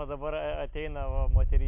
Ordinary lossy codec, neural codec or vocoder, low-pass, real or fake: MP3, 32 kbps; none; 3.6 kHz; real